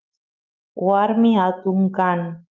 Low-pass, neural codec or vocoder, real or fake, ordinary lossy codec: 7.2 kHz; autoencoder, 48 kHz, 128 numbers a frame, DAC-VAE, trained on Japanese speech; fake; Opus, 32 kbps